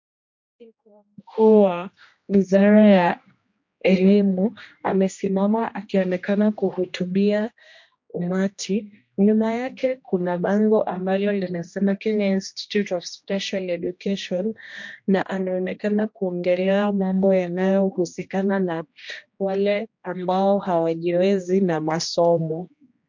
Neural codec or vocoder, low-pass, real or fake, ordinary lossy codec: codec, 16 kHz, 1 kbps, X-Codec, HuBERT features, trained on general audio; 7.2 kHz; fake; MP3, 48 kbps